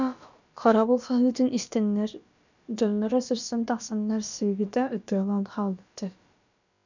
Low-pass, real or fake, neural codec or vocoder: 7.2 kHz; fake; codec, 16 kHz, about 1 kbps, DyCAST, with the encoder's durations